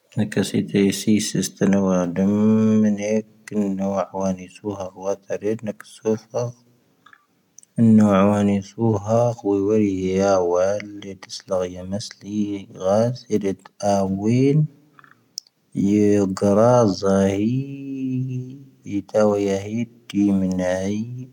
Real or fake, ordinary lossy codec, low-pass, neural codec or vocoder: real; none; 19.8 kHz; none